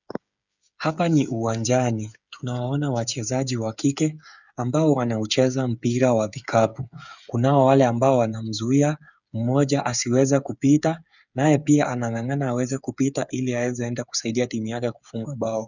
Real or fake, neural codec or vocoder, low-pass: fake; codec, 16 kHz, 8 kbps, FreqCodec, smaller model; 7.2 kHz